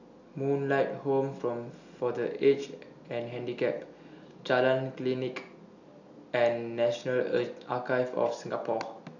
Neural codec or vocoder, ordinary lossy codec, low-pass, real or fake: none; none; 7.2 kHz; real